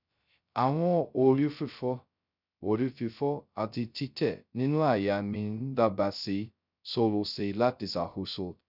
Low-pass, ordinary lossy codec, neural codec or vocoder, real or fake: 5.4 kHz; none; codec, 16 kHz, 0.2 kbps, FocalCodec; fake